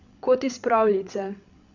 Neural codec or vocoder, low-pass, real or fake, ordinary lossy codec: codec, 16 kHz, 8 kbps, FreqCodec, larger model; 7.2 kHz; fake; none